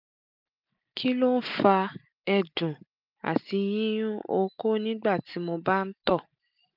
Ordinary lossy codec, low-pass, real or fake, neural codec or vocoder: none; 5.4 kHz; real; none